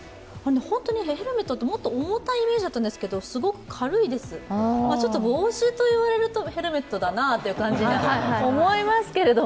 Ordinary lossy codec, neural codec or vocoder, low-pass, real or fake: none; none; none; real